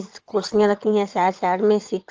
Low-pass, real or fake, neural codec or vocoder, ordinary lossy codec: 7.2 kHz; fake; vocoder, 22.05 kHz, 80 mel bands, WaveNeXt; Opus, 24 kbps